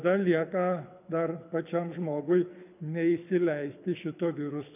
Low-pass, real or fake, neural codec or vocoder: 3.6 kHz; fake; codec, 24 kHz, 6 kbps, HILCodec